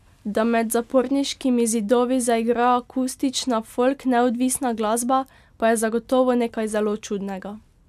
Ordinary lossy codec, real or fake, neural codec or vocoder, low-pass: none; real; none; 14.4 kHz